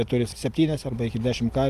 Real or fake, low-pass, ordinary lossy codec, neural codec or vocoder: real; 14.4 kHz; Opus, 32 kbps; none